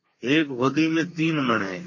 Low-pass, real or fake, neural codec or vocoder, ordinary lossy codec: 7.2 kHz; fake; codec, 32 kHz, 1.9 kbps, SNAC; MP3, 32 kbps